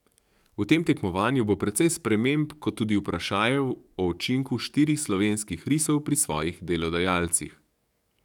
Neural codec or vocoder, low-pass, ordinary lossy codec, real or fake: codec, 44.1 kHz, 7.8 kbps, DAC; 19.8 kHz; none; fake